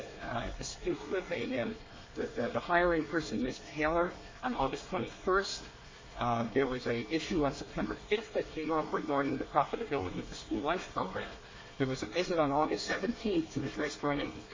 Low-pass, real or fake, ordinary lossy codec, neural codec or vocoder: 7.2 kHz; fake; MP3, 32 kbps; codec, 24 kHz, 1 kbps, SNAC